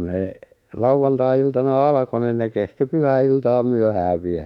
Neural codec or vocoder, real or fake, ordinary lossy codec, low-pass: autoencoder, 48 kHz, 32 numbers a frame, DAC-VAE, trained on Japanese speech; fake; none; 19.8 kHz